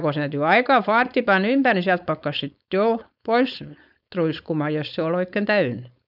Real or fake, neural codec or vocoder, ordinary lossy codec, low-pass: fake; codec, 16 kHz, 4.8 kbps, FACodec; none; 5.4 kHz